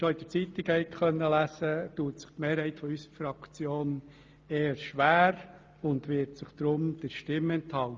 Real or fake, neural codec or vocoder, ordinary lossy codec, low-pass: real; none; Opus, 24 kbps; 7.2 kHz